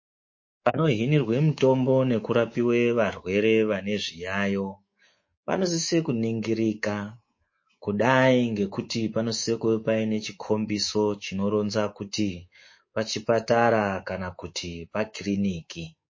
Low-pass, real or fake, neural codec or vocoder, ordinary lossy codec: 7.2 kHz; fake; codec, 24 kHz, 3.1 kbps, DualCodec; MP3, 32 kbps